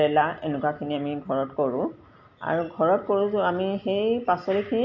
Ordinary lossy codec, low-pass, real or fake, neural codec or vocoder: MP3, 48 kbps; 7.2 kHz; real; none